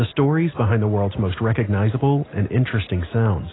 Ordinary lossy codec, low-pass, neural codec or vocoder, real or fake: AAC, 16 kbps; 7.2 kHz; none; real